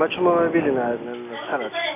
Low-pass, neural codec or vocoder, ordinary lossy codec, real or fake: 3.6 kHz; none; none; real